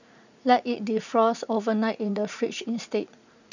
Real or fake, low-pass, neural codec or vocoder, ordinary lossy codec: fake; 7.2 kHz; vocoder, 44.1 kHz, 80 mel bands, Vocos; none